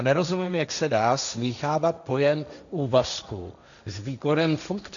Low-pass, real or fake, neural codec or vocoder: 7.2 kHz; fake; codec, 16 kHz, 1.1 kbps, Voila-Tokenizer